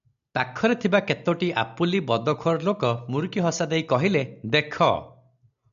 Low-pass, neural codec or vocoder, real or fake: 7.2 kHz; none; real